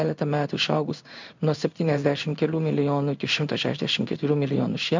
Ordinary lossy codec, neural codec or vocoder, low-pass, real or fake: MP3, 64 kbps; codec, 16 kHz in and 24 kHz out, 1 kbps, XY-Tokenizer; 7.2 kHz; fake